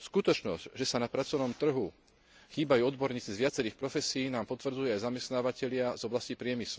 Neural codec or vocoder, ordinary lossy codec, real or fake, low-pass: none; none; real; none